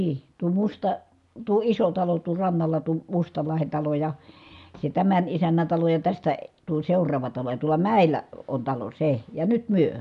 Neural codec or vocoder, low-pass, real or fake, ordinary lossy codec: none; 10.8 kHz; real; none